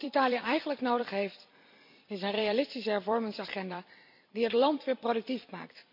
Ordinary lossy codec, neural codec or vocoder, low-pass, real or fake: MP3, 32 kbps; vocoder, 44.1 kHz, 128 mel bands every 256 samples, BigVGAN v2; 5.4 kHz; fake